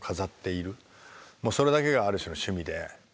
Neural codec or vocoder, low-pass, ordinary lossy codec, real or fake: none; none; none; real